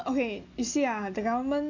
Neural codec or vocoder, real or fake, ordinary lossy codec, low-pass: none; real; AAC, 48 kbps; 7.2 kHz